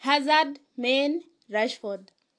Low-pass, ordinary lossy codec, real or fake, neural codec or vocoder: 9.9 kHz; AAC, 48 kbps; real; none